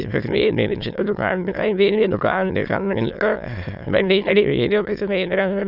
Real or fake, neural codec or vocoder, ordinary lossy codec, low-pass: fake; autoencoder, 22.05 kHz, a latent of 192 numbers a frame, VITS, trained on many speakers; none; 5.4 kHz